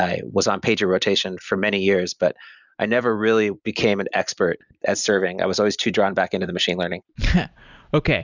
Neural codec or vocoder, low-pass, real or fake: none; 7.2 kHz; real